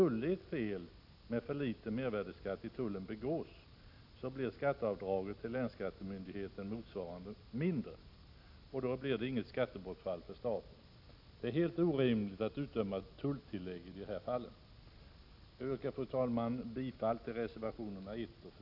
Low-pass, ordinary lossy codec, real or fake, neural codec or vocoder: 5.4 kHz; none; real; none